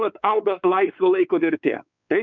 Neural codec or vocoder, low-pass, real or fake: codec, 16 kHz, 4 kbps, X-Codec, WavLM features, trained on Multilingual LibriSpeech; 7.2 kHz; fake